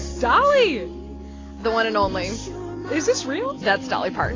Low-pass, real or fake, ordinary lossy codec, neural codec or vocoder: 7.2 kHz; real; AAC, 32 kbps; none